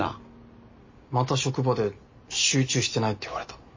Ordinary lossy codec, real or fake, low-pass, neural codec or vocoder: MP3, 32 kbps; real; 7.2 kHz; none